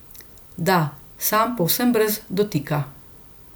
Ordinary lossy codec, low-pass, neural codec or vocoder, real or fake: none; none; none; real